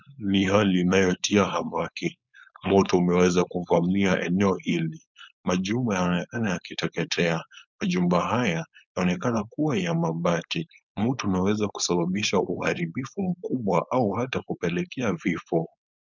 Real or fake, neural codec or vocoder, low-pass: fake; codec, 16 kHz, 4.8 kbps, FACodec; 7.2 kHz